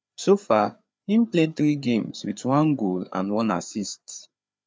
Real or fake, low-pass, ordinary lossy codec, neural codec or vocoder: fake; none; none; codec, 16 kHz, 4 kbps, FreqCodec, larger model